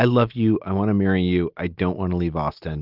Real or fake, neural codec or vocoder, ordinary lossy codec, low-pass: real; none; Opus, 24 kbps; 5.4 kHz